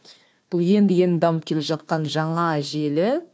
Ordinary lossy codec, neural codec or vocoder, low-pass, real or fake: none; codec, 16 kHz, 1 kbps, FunCodec, trained on Chinese and English, 50 frames a second; none; fake